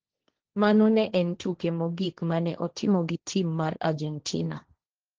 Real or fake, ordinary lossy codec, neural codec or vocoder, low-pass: fake; Opus, 16 kbps; codec, 16 kHz, 1.1 kbps, Voila-Tokenizer; 7.2 kHz